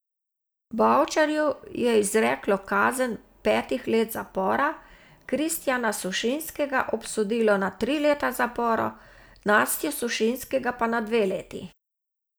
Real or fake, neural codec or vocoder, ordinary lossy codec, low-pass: real; none; none; none